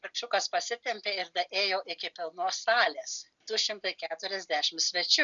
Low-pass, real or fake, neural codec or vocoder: 7.2 kHz; real; none